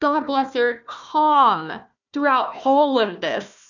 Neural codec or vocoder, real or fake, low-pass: codec, 16 kHz, 1 kbps, FunCodec, trained on Chinese and English, 50 frames a second; fake; 7.2 kHz